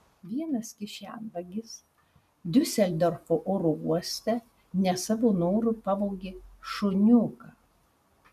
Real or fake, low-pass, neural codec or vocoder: real; 14.4 kHz; none